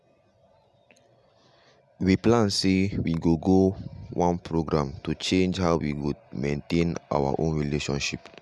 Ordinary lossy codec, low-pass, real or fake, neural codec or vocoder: none; none; real; none